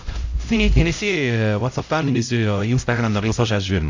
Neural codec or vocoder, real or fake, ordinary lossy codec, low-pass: codec, 16 kHz, 0.5 kbps, X-Codec, HuBERT features, trained on LibriSpeech; fake; AAC, 48 kbps; 7.2 kHz